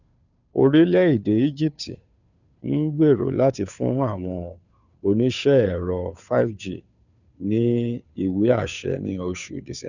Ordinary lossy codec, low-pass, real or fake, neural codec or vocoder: none; 7.2 kHz; fake; codec, 16 kHz, 2 kbps, FunCodec, trained on Chinese and English, 25 frames a second